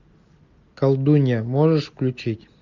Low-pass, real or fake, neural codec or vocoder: 7.2 kHz; real; none